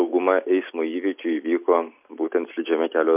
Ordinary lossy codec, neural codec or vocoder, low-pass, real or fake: MP3, 32 kbps; none; 3.6 kHz; real